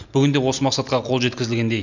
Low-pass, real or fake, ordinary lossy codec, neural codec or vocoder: 7.2 kHz; real; none; none